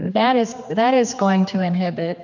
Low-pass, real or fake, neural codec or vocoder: 7.2 kHz; fake; codec, 16 kHz, 2 kbps, X-Codec, HuBERT features, trained on general audio